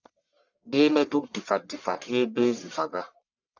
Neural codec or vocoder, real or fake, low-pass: codec, 44.1 kHz, 1.7 kbps, Pupu-Codec; fake; 7.2 kHz